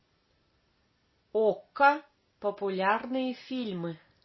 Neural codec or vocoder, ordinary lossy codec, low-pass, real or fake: none; MP3, 24 kbps; 7.2 kHz; real